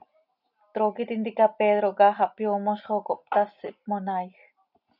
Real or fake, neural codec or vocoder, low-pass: real; none; 5.4 kHz